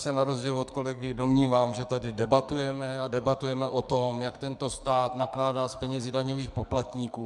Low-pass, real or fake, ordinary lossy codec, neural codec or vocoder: 10.8 kHz; fake; MP3, 96 kbps; codec, 44.1 kHz, 2.6 kbps, SNAC